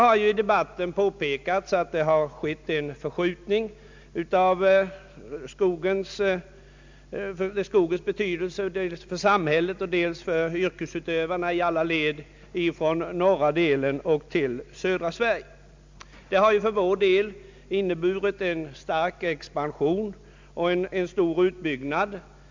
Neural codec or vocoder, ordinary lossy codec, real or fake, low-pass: none; MP3, 64 kbps; real; 7.2 kHz